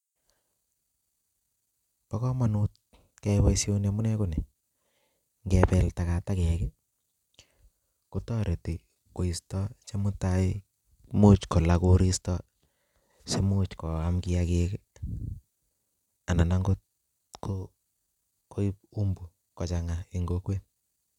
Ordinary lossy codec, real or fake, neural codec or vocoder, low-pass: none; real; none; 19.8 kHz